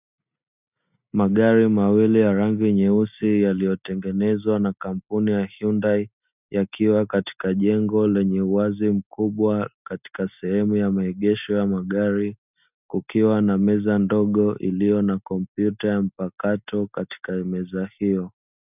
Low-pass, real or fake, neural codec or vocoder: 3.6 kHz; real; none